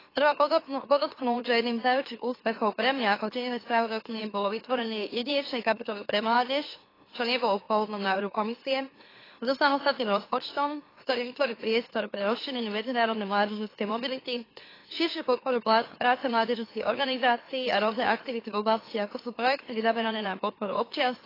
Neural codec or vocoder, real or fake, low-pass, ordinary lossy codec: autoencoder, 44.1 kHz, a latent of 192 numbers a frame, MeloTTS; fake; 5.4 kHz; AAC, 24 kbps